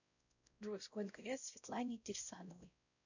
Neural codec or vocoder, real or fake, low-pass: codec, 16 kHz, 0.5 kbps, X-Codec, WavLM features, trained on Multilingual LibriSpeech; fake; 7.2 kHz